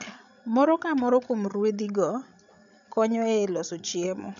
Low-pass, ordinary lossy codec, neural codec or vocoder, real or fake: 7.2 kHz; none; codec, 16 kHz, 16 kbps, FreqCodec, larger model; fake